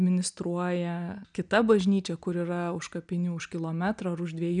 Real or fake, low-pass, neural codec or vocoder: real; 9.9 kHz; none